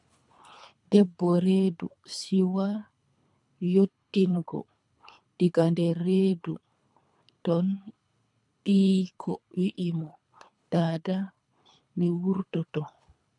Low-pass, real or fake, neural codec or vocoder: 10.8 kHz; fake; codec, 24 kHz, 3 kbps, HILCodec